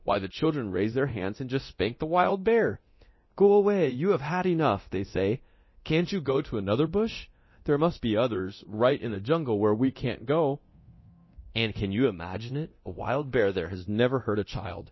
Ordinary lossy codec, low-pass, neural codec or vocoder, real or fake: MP3, 24 kbps; 7.2 kHz; codec, 24 kHz, 0.9 kbps, DualCodec; fake